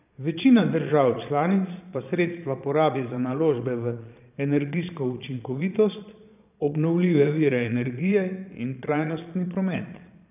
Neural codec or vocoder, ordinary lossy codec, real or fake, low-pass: vocoder, 22.05 kHz, 80 mel bands, Vocos; none; fake; 3.6 kHz